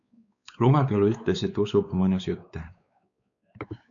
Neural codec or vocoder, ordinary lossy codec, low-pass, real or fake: codec, 16 kHz, 4 kbps, X-Codec, WavLM features, trained on Multilingual LibriSpeech; MP3, 96 kbps; 7.2 kHz; fake